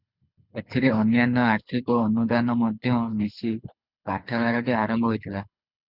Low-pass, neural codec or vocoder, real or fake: 5.4 kHz; codec, 24 kHz, 6 kbps, HILCodec; fake